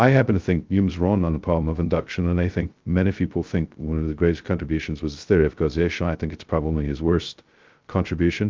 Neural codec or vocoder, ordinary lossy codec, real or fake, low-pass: codec, 16 kHz, 0.2 kbps, FocalCodec; Opus, 32 kbps; fake; 7.2 kHz